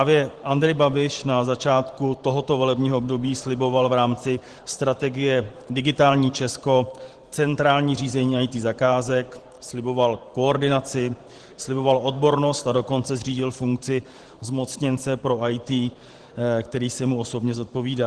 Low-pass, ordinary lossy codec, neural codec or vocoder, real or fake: 10.8 kHz; Opus, 16 kbps; vocoder, 44.1 kHz, 128 mel bands every 512 samples, BigVGAN v2; fake